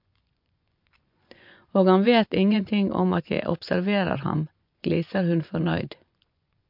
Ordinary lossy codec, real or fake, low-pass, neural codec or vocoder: MP3, 48 kbps; fake; 5.4 kHz; vocoder, 24 kHz, 100 mel bands, Vocos